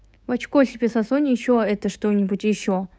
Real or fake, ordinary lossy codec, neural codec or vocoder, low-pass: fake; none; codec, 16 kHz, 6 kbps, DAC; none